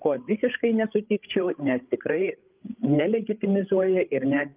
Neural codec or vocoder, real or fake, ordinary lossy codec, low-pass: codec, 16 kHz, 8 kbps, FreqCodec, larger model; fake; Opus, 24 kbps; 3.6 kHz